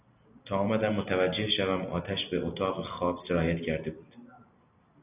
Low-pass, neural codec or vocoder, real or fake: 3.6 kHz; none; real